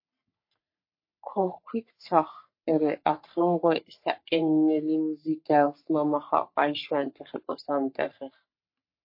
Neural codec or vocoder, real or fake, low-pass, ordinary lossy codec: codec, 44.1 kHz, 3.4 kbps, Pupu-Codec; fake; 5.4 kHz; MP3, 32 kbps